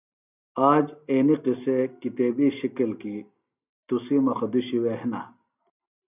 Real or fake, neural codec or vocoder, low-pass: real; none; 3.6 kHz